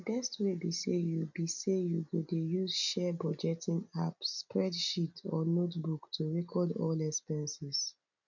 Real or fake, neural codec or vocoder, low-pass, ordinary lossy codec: real; none; 7.2 kHz; none